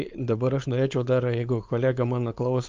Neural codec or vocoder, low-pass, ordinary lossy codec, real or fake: codec, 16 kHz, 4.8 kbps, FACodec; 7.2 kHz; Opus, 24 kbps; fake